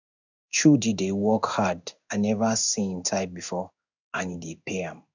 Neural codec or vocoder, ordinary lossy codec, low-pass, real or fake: codec, 16 kHz in and 24 kHz out, 1 kbps, XY-Tokenizer; none; 7.2 kHz; fake